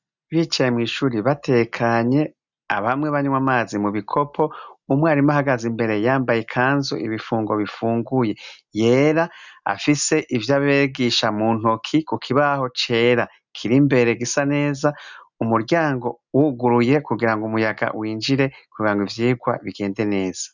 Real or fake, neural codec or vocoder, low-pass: real; none; 7.2 kHz